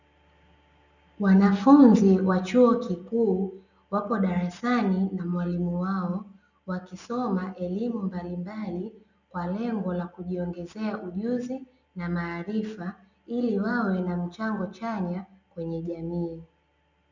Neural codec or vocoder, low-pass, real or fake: none; 7.2 kHz; real